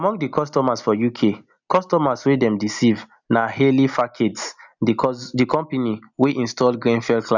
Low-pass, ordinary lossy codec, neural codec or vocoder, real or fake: 7.2 kHz; none; none; real